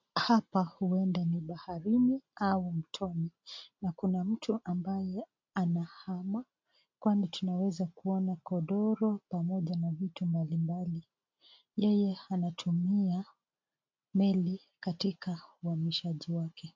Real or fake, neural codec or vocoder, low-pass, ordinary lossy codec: real; none; 7.2 kHz; MP3, 48 kbps